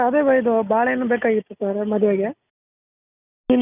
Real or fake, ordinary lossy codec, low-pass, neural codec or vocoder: real; none; 3.6 kHz; none